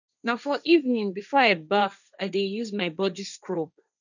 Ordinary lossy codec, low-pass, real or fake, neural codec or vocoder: none; 7.2 kHz; fake; codec, 16 kHz, 1.1 kbps, Voila-Tokenizer